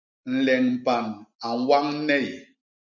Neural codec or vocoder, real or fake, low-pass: none; real; 7.2 kHz